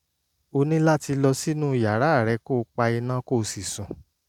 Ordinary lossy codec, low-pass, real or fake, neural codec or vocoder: none; 19.8 kHz; real; none